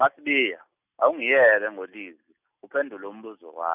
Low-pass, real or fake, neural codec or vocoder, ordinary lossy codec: 3.6 kHz; real; none; none